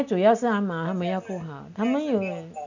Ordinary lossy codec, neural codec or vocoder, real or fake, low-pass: none; none; real; 7.2 kHz